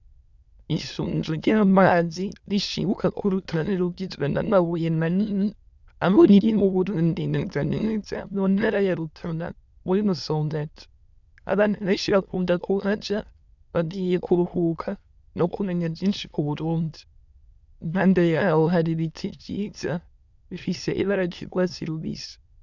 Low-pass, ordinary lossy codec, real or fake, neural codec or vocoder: 7.2 kHz; Opus, 64 kbps; fake; autoencoder, 22.05 kHz, a latent of 192 numbers a frame, VITS, trained on many speakers